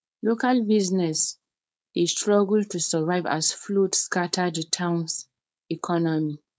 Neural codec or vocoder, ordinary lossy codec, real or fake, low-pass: codec, 16 kHz, 4.8 kbps, FACodec; none; fake; none